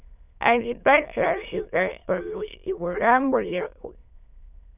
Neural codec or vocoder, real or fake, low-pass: autoencoder, 22.05 kHz, a latent of 192 numbers a frame, VITS, trained on many speakers; fake; 3.6 kHz